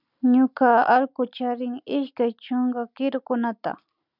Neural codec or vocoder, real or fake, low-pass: none; real; 5.4 kHz